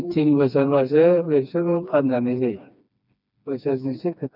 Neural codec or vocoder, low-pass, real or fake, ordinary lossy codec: codec, 16 kHz, 2 kbps, FreqCodec, smaller model; 5.4 kHz; fake; none